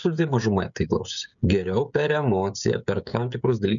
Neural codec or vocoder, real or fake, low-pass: codec, 16 kHz, 16 kbps, FreqCodec, smaller model; fake; 7.2 kHz